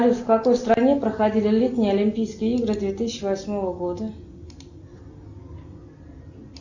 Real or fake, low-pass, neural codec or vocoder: real; 7.2 kHz; none